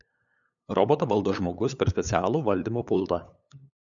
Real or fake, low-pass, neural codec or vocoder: fake; 7.2 kHz; codec, 16 kHz, 8 kbps, FunCodec, trained on LibriTTS, 25 frames a second